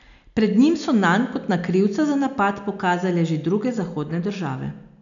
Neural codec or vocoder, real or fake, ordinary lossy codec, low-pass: none; real; none; 7.2 kHz